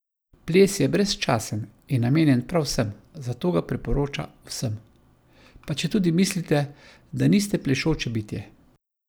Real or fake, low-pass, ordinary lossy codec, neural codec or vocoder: real; none; none; none